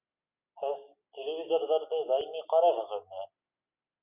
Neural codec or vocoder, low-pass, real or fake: none; 3.6 kHz; real